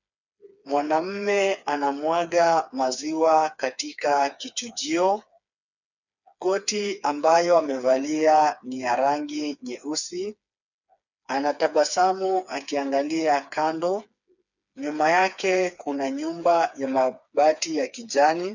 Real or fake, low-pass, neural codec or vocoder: fake; 7.2 kHz; codec, 16 kHz, 4 kbps, FreqCodec, smaller model